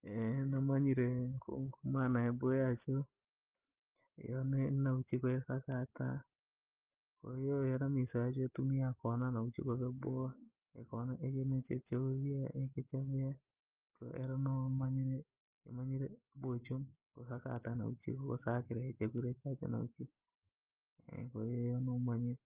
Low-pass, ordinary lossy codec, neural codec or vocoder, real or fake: 3.6 kHz; Opus, 32 kbps; none; real